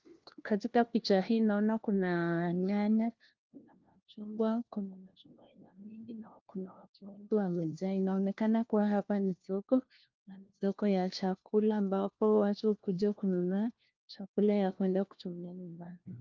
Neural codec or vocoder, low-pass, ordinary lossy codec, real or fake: codec, 16 kHz, 1 kbps, FunCodec, trained on LibriTTS, 50 frames a second; 7.2 kHz; Opus, 32 kbps; fake